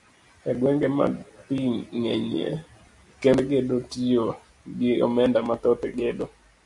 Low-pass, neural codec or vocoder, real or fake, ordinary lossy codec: 10.8 kHz; none; real; AAC, 64 kbps